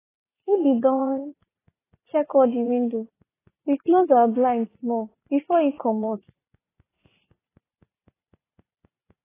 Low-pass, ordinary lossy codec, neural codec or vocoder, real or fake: 3.6 kHz; AAC, 16 kbps; vocoder, 44.1 kHz, 80 mel bands, Vocos; fake